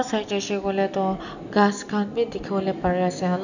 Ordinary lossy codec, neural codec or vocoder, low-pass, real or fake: none; none; 7.2 kHz; real